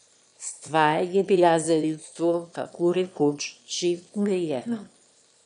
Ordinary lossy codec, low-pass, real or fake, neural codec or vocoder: none; 9.9 kHz; fake; autoencoder, 22.05 kHz, a latent of 192 numbers a frame, VITS, trained on one speaker